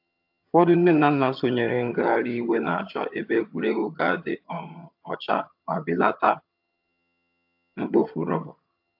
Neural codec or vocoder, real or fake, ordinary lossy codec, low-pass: vocoder, 22.05 kHz, 80 mel bands, HiFi-GAN; fake; AAC, 48 kbps; 5.4 kHz